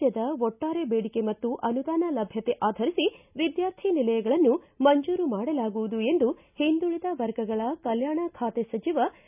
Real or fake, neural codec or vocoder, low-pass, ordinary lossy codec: real; none; 3.6 kHz; none